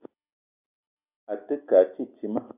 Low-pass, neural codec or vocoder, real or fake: 3.6 kHz; none; real